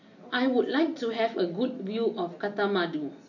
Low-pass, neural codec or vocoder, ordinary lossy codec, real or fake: 7.2 kHz; none; none; real